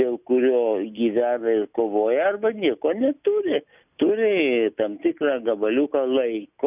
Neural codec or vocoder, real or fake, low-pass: none; real; 3.6 kHz